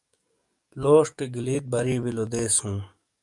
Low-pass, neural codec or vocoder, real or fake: 10.8 kHz; codec, 44.1 kHz, 7.8 kbps, DAC; fake